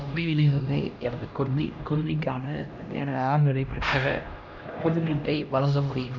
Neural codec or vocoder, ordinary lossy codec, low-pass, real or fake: codec, 16 kHz, 1 kbps, X-Codec, HuBERT features, trained on LibriSpeech; none; 7.2 kHz; fake